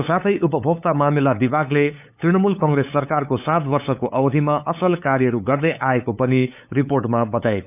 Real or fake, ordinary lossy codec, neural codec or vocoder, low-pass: fake; none; codec, 16 kHz, 8 kbps, FunCodec, trained on LibriTTS, 25 frames a second; 3.6 kHz